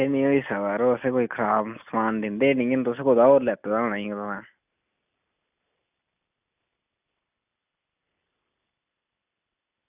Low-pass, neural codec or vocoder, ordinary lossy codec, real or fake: 3.6 kHz; none; none; real